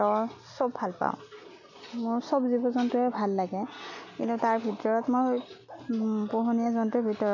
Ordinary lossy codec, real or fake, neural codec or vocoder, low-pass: none; real; none; 7.2 kHz